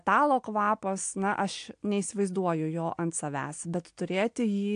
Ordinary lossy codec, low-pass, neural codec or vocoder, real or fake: AAC, 64 kbps; 9.9 kHz; none; real